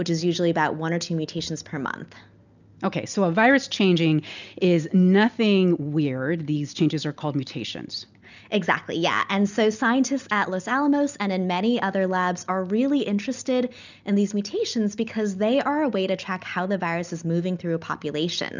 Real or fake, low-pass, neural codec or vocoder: real; 7.2 kHz; none